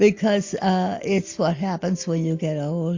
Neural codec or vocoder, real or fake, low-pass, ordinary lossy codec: none; real; 7.2 kHz; AAC, 48 kbps